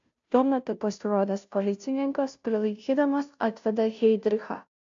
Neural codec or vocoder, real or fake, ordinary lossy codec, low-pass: codec, 16 kHz, 0.5 kbps, FunCodec, trained on Chinese and English, 25 frames a second; fake; AAC, 64 kbps; 7.2 kHz